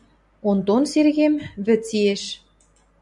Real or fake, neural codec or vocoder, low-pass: real; none; 10.8 kHz